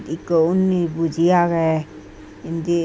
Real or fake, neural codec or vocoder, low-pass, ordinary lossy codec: real; none; none; none